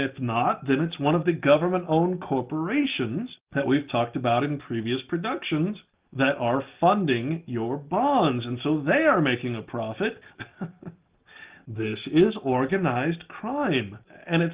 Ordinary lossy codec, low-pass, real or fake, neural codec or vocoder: Opus, 32 kbps; 3.6 kHz; real; none